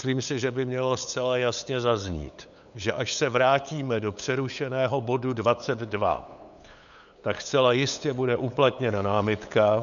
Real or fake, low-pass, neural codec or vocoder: fake; 7.2 kHz; codec, 16 kHz, 8 kbps, FunCodec, trained on LibriTTS, 25 frames a second